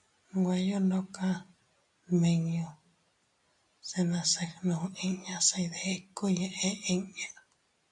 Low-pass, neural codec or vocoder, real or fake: 10.8 kHz; none; real